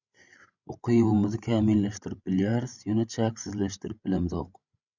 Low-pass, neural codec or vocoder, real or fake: 7.2 kHz; codec, 16 kHz, 16 kbps, FreqCodec, larger model; fake